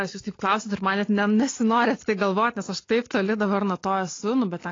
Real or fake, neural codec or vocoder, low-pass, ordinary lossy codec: real; none; 7.2 kHz; AAC, 32 kbps